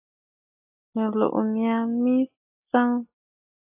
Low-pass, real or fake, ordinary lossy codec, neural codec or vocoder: 3.6 kHz; real; AAC, 32 kbps; none